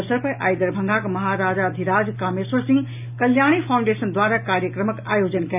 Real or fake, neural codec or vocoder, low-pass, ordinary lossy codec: real; none; 3.6 kHz; none